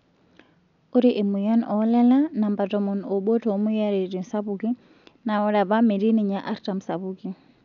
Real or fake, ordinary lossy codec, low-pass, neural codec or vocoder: real; none; 7.2 kHz; none